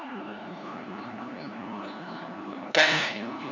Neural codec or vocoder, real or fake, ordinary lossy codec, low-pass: codec, 16 kHz, 1 kbps, FunCodec, trained on LibriTTS, 50 frames a second; fake; none; 7.2 kHz